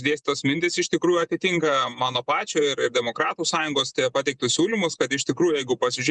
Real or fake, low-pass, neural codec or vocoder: fake; 10.8 kHz; vocoder, 48 kHz, 128 mel bands, Vocos